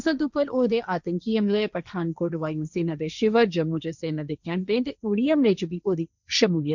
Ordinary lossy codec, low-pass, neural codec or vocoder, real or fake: none; 7.2 kHz; codec, 16 kHz, 1.1 kbps, Voila-Tokenizer; fake